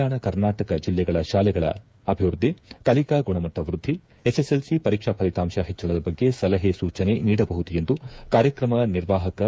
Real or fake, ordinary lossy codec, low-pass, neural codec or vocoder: fake; none; none; codec, 16 kHz, 8 kbps, FreqCodec, smaller model